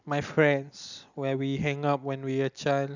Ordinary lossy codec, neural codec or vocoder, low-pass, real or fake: none; none; 7.2 kHz; real